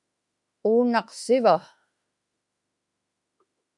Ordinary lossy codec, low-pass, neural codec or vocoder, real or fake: MP3, 96 kbps; 10.8 kHz; autoencoder, 48 kHz, 32 numbers a frame, DAC-VAE, trained on Japanese speech; fake